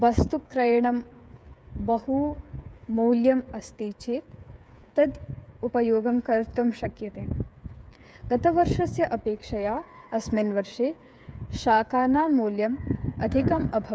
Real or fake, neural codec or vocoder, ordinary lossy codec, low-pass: fake; codec, 16 kHz, 8 kbps, FreqCodec, smaller model; none; none